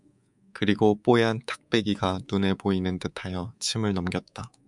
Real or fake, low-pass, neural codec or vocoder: fake; 10.8 kHz; codec, 24 kHz, 3.1 kbps, DualCodec